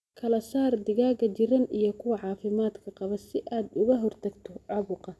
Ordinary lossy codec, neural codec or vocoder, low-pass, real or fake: none; none; none; real